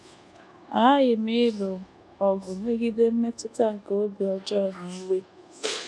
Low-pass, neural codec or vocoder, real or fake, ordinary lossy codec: none; codec, 24 kHz, 1.2 kbps, DualCodec; fake; none